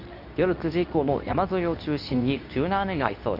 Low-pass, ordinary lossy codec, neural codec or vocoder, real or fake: 5.4 kHz; none; codec, 24 kHz, 0.9 kbps, WavTokenizer, medium speech release version 2; fake